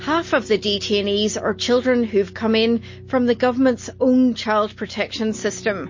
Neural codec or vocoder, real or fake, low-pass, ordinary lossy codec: none; real; 7.2 kHz; MP3, 32 kbps